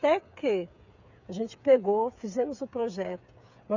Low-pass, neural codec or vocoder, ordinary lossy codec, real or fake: 7.2 kHz; codec, 16 kHz, 8 kbps, FreqCodec, smaller model; none; fake